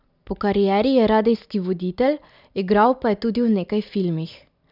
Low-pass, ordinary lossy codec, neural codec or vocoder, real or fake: 5.4 kHz; none; none; real